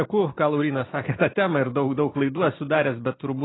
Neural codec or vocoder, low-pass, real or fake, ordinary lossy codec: none; 7.2 kHz; real; AAC, 16 kbps